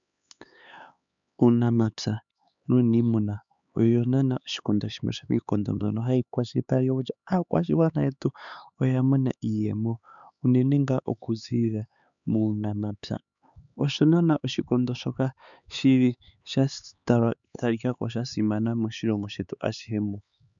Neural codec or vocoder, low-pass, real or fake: codec, 16 kHz, 4 kbps, X-Codec, HuBERT features, trained on LibriSpeech; 7.2 kHz; fake